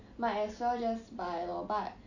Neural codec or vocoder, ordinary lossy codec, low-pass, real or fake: none; none; 7.2 kHz; real